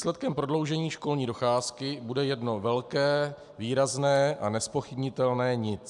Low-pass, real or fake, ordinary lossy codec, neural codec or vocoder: 10.8 kHz; real; MP3, 96 kbps; none